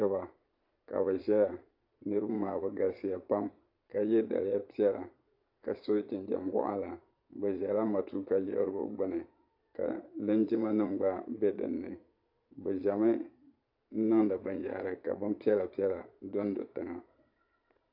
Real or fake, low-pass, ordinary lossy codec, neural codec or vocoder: fake; 5.4 kHz; AAC, 48 kbps; vocoder, 22.05 kHz, 80 mel bands, Vocos